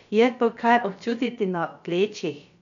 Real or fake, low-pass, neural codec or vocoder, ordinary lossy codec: fake; 7.2 kHz; codec, 16 kHz, about 1 kbps, DyCAST, with the encoder's durations; none